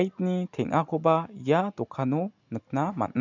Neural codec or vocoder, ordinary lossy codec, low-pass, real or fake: none; none; 7.2 kHz; real